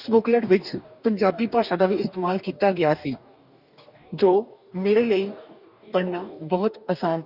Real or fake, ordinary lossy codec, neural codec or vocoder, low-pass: fake; none; codec, 44.1 kHz, 2.6 kbps, DAC; 5.4 kHz